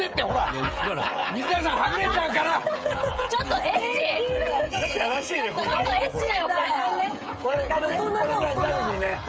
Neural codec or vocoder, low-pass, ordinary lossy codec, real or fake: codec, 16 kHz, 16 kbps, FreqCodec, smaller model; none; none; fake